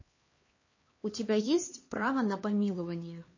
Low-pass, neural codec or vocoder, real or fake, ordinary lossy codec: 7.2 kHz; codec, 16 kHz, 2 kbps, X-Codec, HuBERT features, trained on LibriSpeech; fake; MP3, 32 kbps